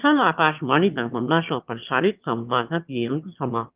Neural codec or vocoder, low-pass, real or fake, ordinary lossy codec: autoencoder, 22.05 kHz, a latent of 192 numbers a frame, VITS, trained on one speaker; 3.6 kHz; fake; Opus, 32 kbps